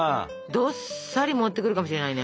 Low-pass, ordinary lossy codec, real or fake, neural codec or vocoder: none; none; real; none